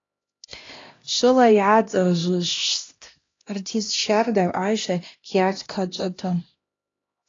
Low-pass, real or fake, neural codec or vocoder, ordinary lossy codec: 7.2 kHz; fake; codec, 16 kHz, 1 kbps, X-Codec, HuBERT features, trained on LibriSpeech; AAC, 32 kbps